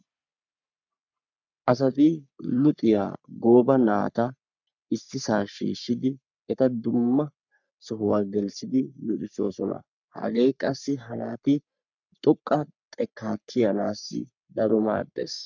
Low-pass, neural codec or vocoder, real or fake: 7.2 kHz; codec, 44.1 kHz, 3.4 kbps, Pupu-Codec; fake